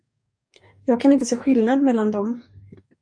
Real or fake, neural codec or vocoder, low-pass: fake; codec, 44.1 kHz, 2.6 kbps, DAC; 9.9 kHz